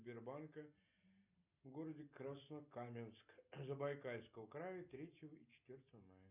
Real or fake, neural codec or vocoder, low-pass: real; none; 3.6 kHz